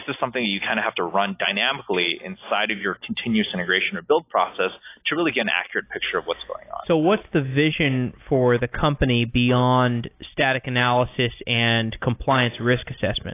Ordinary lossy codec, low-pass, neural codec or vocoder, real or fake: AAC, 24 kbps; 3.6 kHz; none; real